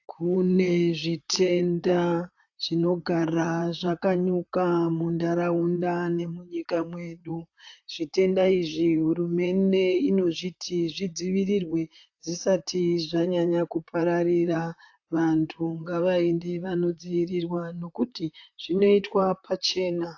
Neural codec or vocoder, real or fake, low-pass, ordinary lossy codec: vocoder, 44.1 kHz, 128 mel bands, Pupu-Vocoder; fake; 7.2 kHz; AAC, 48 kbps